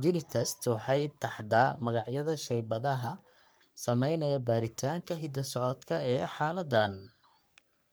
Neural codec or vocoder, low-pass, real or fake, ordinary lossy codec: codec, 44.1 kHz, 3.4 kbps, Pupu-Codec; none; fake; none